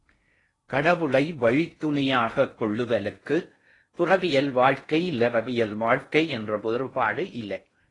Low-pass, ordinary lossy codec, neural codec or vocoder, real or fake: 10.8 kHz; AAC, 32 kbps; codec, 16 kHz in and 24 kHz out, 0.6 kbps, FocalCodec, streaming, 4096 codes; fake